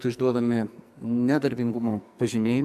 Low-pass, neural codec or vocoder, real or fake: 14.4 kHz; codec, 44.1 kHz, 2.6 kbps, SNAC; fake